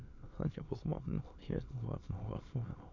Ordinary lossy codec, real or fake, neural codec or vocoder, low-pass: AAC, 48 kbps; fake; autoencoder, 22.05 kHz, a latent of 192 numbers a frame, VITS, trained on many speakers; 7.2 kHz